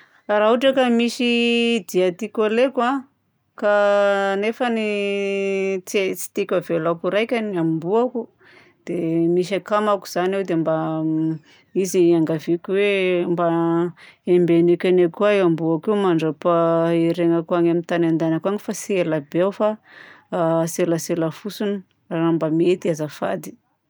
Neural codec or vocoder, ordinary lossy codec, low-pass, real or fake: none; none; none; real